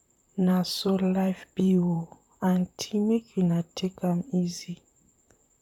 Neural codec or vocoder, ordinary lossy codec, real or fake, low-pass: vocoder, 44.1 kHz, 128 mel bands, Pupu-Vocoder; none; fake; 19.8 kHz